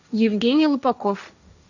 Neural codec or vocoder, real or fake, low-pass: codec, 16 kHz, 1.1 kbps, Voila-Tokenizer; fake; 7.2 kHz